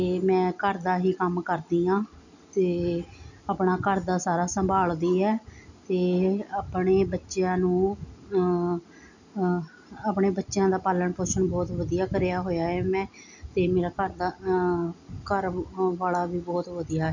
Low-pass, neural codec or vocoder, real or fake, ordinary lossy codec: 7.2 kHz; none; real; none